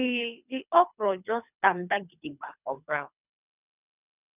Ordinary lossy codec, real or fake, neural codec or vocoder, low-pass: none; fake; codec, 24 kHz, 3 kbps, HILCodec; 3.6 kHz